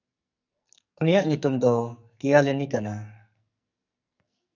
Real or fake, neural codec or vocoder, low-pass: fake; codec, 44.1 kHz, 2.6 kbps, SNAC; 7.2 kHz